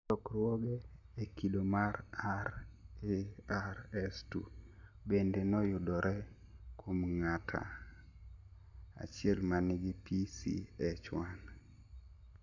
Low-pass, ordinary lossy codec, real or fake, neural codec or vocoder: 7.2 kHz; MP3, 64 kbps; real; none